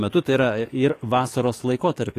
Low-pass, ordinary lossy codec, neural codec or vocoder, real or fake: 14.4 kHz; AAC, 48 kbps; codec, 44.1 kHz, 7.8 kbps, DAC; fake